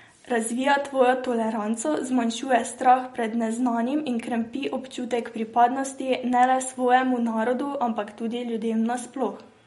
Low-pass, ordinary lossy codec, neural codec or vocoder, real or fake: 19.8 kHz; MP3, 48 kbps; none; real